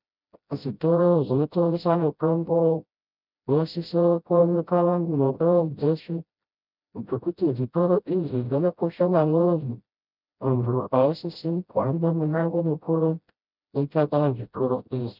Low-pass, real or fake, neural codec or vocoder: 5.4 kHz; fake; codec, 16 kHz, 0.5 kbps, FreqCodec, smaller model